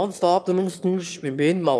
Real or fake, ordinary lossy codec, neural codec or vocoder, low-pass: fake; none; autoencoder, 22.05 kHz, a latent of 192 numbers a frame, VITS, trained on one speaker; none